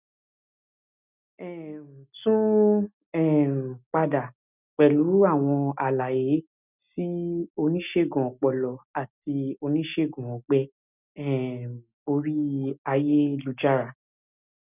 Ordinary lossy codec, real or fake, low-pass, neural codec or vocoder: none; real; 3.6 kHz; none